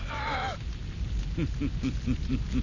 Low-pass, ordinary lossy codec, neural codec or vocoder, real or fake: 7.2 kHz; none; none; real